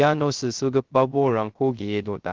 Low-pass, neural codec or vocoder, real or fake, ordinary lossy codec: 7.2 kHz; codec, 16 kHz, 0.3 kbps, FocalCodec; fake; Opus, 16 kbps